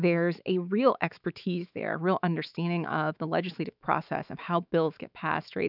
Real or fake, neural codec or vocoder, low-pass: fake; autoencoder, 48 kHz, 128 numbers a frame, DAC-VAE, trained on Japanese speech; 5.4 kHz